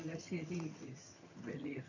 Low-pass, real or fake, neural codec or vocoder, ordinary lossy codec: 7.2 kHz; fake; vocoder, 22.05 kHz, 80 mel bands, HiFi-GAN; none